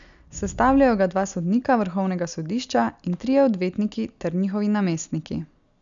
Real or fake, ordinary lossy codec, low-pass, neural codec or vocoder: real; none; 7.2 kHz; none